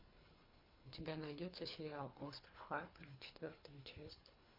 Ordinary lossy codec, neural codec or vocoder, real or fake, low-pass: MP3, 32 kbps; codec, 24 kHz, 3 kbps, HILCodec; fake; 5.4 kHz